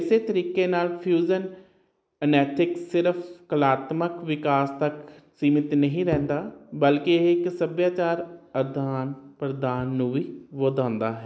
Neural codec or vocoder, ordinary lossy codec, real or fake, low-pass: none; none; real; none